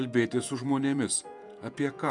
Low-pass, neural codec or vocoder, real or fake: 10.8 kHz; vocoder, 48 kHz, 128 mel bands, Vocos; fake